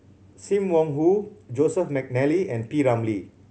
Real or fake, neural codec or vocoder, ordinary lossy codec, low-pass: real; none; none; none